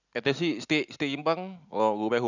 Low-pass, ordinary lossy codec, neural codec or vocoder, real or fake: 7.2 kHz; none; none; real